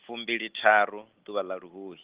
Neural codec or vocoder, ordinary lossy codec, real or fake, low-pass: none; Opus, 16 kbps; real; 3.6 kHz